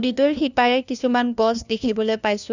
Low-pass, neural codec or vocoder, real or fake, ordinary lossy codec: 7.2 kHz; codec, 16 kHz, 1 kbps, FunCodec, trained on LibriTTS, 50 frames a second; fake; none